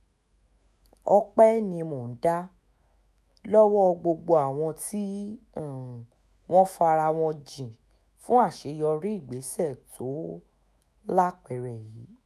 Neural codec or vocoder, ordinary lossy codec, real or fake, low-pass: autoencoder, 48 kHz, 128 numbers a frame, DAC-VAE, trained on Japanese speech; none; fake; 14.4 kHz